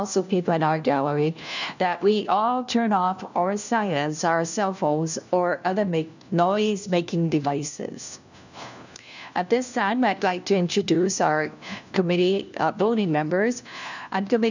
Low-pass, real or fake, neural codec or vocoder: 7.2 kHz; fake; codec, 16 kHz, 1 kbps, FunCodec, trained on LibriTTS, 50 frames a second